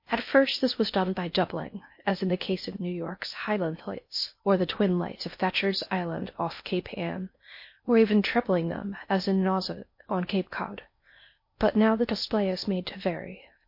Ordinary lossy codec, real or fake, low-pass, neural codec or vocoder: MP3, 32 kbps; fake; 5.4 kHz; codec, 16 kHz in and 24 kHz out, 0.6 kbps, FocalCodec, streaming, 4096 codes